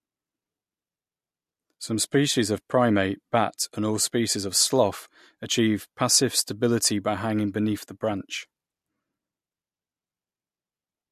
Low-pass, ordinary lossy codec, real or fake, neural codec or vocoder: 14.4 kHz; MP3, 64 kbps; real; none